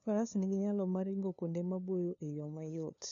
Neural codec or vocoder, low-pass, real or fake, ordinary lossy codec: codec, 16 kHz, 2 kbps, FunCodec, trained on LibriTTS, 25 frames a second; 7.2 kHz; fake; none